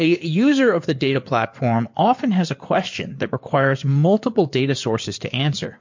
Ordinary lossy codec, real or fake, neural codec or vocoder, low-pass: MP3, 48 kbps; fake; codec, 16 kHz in and 24 kHz out, 2.2 kbps, FireRedTTS-2 codec; 7.2 kHz